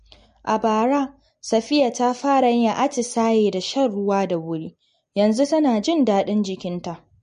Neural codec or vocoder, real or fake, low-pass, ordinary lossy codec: none; real; 9.9 kHz; MP3, 48 kbps